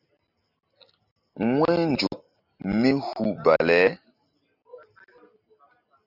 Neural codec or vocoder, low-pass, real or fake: none; 5.4 kHz; real